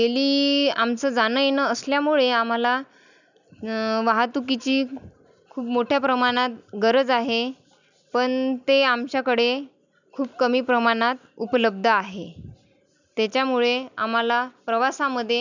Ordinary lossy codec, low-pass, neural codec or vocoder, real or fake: none; 7.2 kHz; none; real